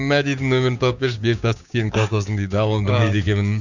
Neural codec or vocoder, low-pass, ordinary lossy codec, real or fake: codec, 16 kHz, 6 kbps, DAC; 7.2 kHz; none; fake